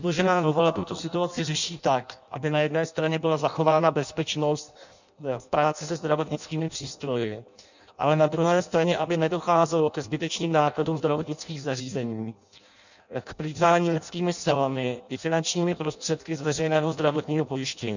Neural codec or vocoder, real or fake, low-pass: codec, 16 kHz in and 24 kHz out, 0.6 kbps, FireRedTTS-2 codec; fake; 7.2 kHz